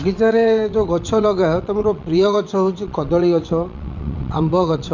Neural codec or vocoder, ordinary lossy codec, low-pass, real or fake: vocoder, 22.05 kHz, 80 mel bands, WaveNeXt; none; 7.2 kHz; fake